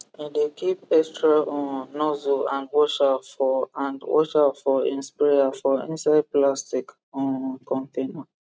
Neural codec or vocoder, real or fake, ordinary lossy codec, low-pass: none; real; none; none